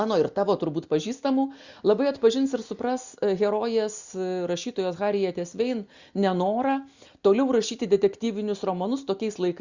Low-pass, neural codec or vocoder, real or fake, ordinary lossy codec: 7.2 kHz; none; real; Opus, 64 kbps